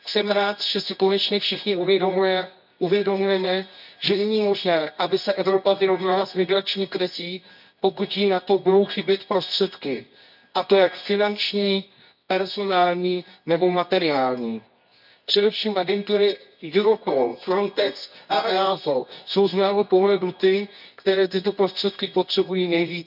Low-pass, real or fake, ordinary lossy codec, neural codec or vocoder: 5.4 kHz; fake; none; codec, 24 kHz, 0.9 kbps, WavTokenizer, medium music audio release